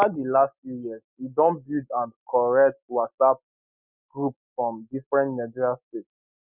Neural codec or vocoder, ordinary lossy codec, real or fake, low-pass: none; none; real; 3.6 kHz